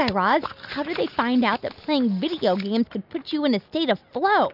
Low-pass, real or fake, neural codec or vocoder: 5.4 kHz; real; none